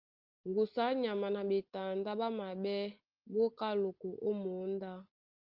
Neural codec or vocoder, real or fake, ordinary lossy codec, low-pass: none; real; Opus, 32 kbps; 5.4 kHz